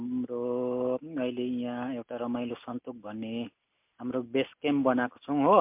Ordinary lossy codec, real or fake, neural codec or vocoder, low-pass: MP3, 32 kbps; real; none; 3.6 kHz